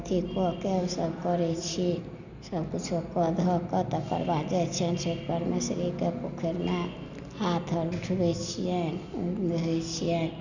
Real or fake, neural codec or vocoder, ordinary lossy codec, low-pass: real; none; none; 7.2 kHz